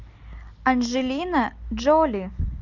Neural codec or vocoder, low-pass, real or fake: none; 7.2 kHz; real